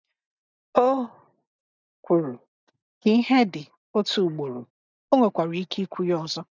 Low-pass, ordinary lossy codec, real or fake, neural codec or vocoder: 7.2 kHz; none; real; none